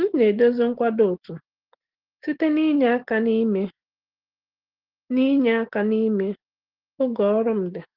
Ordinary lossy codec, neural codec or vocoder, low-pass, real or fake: Opus, 16 kbps; none; 5.4 kHz; real